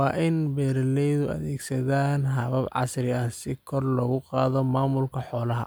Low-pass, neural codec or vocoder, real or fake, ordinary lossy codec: none; none; real; none